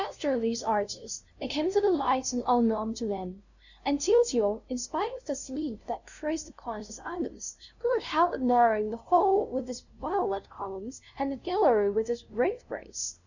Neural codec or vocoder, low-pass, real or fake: codec, 16 kHz, 0.5 kbps, FunCodec, trained on LibriTTS, 25 frames a second; 7.2 kHz; fake